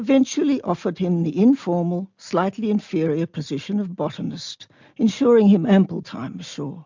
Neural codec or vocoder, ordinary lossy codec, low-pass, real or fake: none; MP3, 64 kbps; 7.2 kHz; real